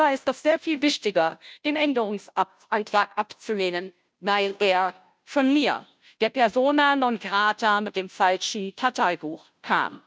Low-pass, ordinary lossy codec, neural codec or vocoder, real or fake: none; none; codec, 16 kHz, 0.5 kbps, FunCodec, trained on Chinese and English, 25 frames a second; fake